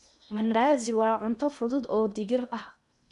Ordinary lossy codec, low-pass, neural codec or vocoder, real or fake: none; 10.8 kHz; codec, 16 kHz in and 24 kHz out, 0.8 kbps, FocalCodec, streaming, 65536 codes; fake